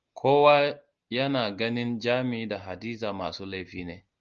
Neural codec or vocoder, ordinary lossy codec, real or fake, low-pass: none; Opus, 24 kbps; real; 7.2 kHz